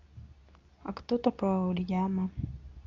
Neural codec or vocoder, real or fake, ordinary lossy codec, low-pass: codec, 24 kHz, 0.9 kbps, WavTokenizer, medium speech release version 2; fake; none; 7.2 kHz